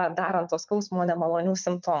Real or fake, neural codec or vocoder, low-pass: fake; vocoder, 22.05 kHz, 80 mel bands, WaveNeXt; 7.2 kHz